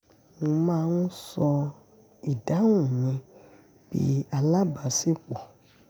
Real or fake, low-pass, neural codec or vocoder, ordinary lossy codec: real; none; none; none